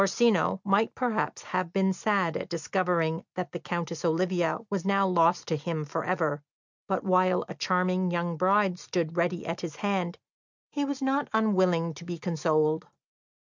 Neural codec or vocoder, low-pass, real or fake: none; 7.2 kHz; real